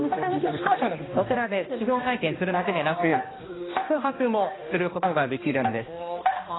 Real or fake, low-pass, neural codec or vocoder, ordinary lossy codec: fake; 7.2 kHz; codec, 16 kHz, 1 kbps, X-Codec, HuBERT features, trained on balanced general audio; AAC, 16 kbps